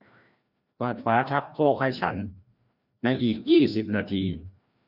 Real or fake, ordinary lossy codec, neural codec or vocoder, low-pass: fake; none; codec, 16 kHz, 1 kbps, FreqCodec, larger model; 5.4 kHz